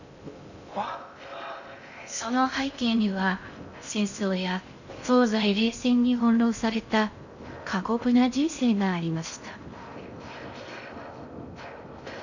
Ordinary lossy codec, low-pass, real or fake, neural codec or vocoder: none; 7.2 kHz; fake; codec, 16 kHz in and 24 kHz out, 0.6 kbps, FocalCodec, streaming, 2048 codes